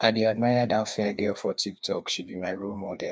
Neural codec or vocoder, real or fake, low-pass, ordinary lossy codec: codec, 16 kHz, 2 kbps, FreqCodec, larger model; fake; none; none